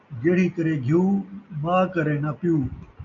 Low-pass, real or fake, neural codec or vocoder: 7.2 kHz; real; none